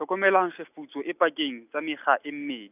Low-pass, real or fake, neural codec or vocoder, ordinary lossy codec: 3.6 kHz; real; none; none